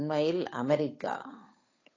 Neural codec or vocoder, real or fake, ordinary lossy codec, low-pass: codec, 16 kHz, 8 kbps, FunCodec, trained on Chinese and English, 25 frames a second; fake; AAC, 32 kbps; 7.2 kHz